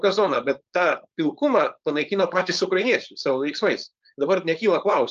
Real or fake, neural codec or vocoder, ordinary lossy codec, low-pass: fake; codec, 16 kHz, 4.8 kbps, FACodec; Opus, 24 kbps; 7.2 kHz